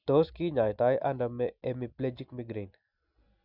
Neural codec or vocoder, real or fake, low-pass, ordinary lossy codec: none; real; 5.4 kHz; none